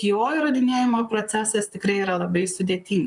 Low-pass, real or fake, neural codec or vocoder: 10.8 kHz; fake; vocoder, 44.1 kHz, 128 mel bands, Pupu-Vocoder